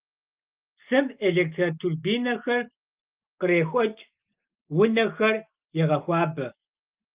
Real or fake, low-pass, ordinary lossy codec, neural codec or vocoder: real; 3.6 kHz; Opus, 24 kbps; none